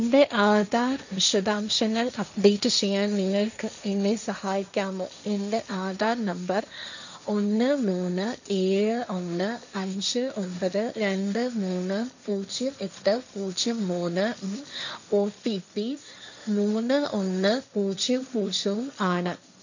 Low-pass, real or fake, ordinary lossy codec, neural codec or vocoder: 7.2 kHz; fake; none; codec, 16 kHz, 1.1 kbps, Voila-Tokenizer